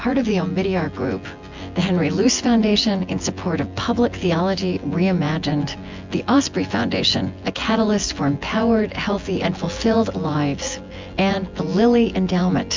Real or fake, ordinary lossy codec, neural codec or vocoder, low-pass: fake; MP3, 64 kbps; vocoder, 24 kHz, 100 mel bands, Vocos; 7.2 kHz